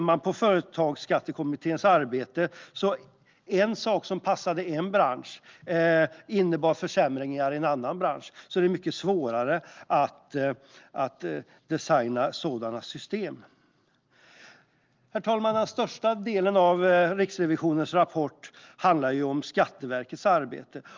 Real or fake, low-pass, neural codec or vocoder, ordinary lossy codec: real; 7.2 kHz; none; Opus, 32 kbps